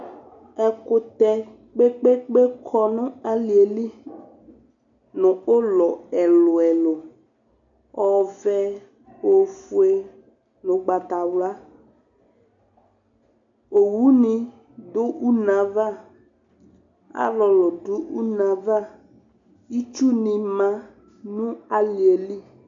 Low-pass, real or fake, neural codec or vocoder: 7.2 kHz; real; none